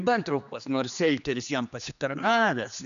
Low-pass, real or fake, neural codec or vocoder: 7.2 kHz; fake; codec, 16 kHz, 2 kbps, X-Codec, HuBERT features, trained on general audio